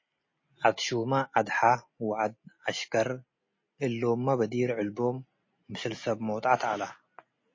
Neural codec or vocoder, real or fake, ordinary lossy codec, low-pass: none; real; MP3, 32 kbps; 7.2 kHz